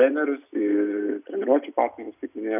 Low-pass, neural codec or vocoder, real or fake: 3.6 kHz; none; real